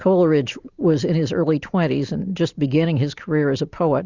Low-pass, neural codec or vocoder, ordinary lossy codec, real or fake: 7.2 kHz; none; Opus, 64 kbps; real